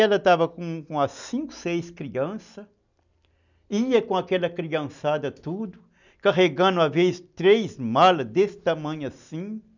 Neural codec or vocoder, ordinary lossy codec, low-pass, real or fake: none; none; 7.2 kHz; real